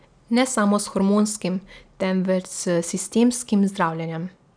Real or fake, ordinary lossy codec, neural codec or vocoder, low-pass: real; none; none; 9.9 kHz